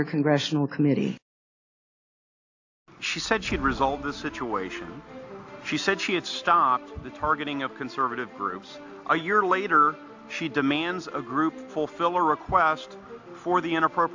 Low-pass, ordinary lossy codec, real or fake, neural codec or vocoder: 7.2 kHz; AAC, 48 kbps; real; none